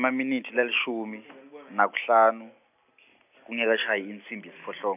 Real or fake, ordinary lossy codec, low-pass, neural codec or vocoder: real; none; 3.6 kHz; none